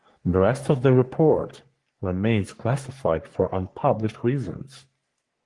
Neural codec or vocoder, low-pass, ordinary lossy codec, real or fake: codec, 44.1 kHz, 3.4 kbps, Pupu-Codec; 10.8 kHz; Opus, 24 kbps; fake